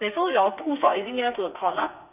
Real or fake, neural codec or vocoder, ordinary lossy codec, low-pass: fake; codec, 32 kHz, 1.9 kbps, SNAC; none; 3.6 kHz